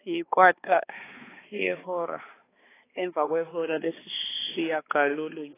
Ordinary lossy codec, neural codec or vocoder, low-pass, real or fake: AAC, 16 kbps; codec, 16 kHz, 2 kbps, X-Codec, HuBERT features, trained on balanced general audio; 3.6 kHz; fake